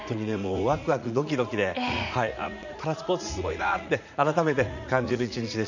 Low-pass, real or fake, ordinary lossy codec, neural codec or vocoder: 7.2 kHz; fake; none; vocoder, 44.1 kHz, 80 mel bands, Vocos